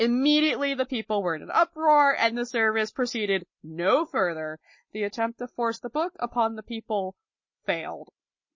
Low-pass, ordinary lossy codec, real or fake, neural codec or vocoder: 7.2 kHz; MP3, 32 kbps; real; none